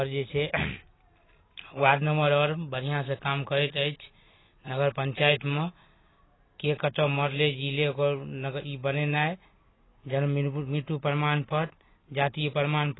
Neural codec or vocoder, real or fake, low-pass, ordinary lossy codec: none; real; 7.2 kHz; AAC, 16 kbps